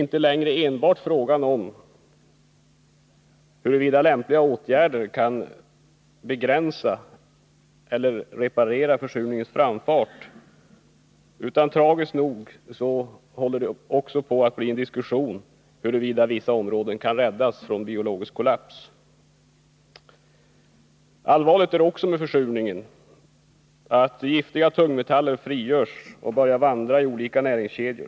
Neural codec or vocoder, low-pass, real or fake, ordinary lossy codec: none; none; real; none